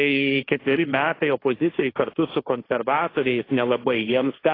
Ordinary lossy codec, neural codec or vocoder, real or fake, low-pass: AAC, 24 kbps; codec, 16 kHz, 1.1 kbps, Voila-Tokenizer; fake; 5.4 kHz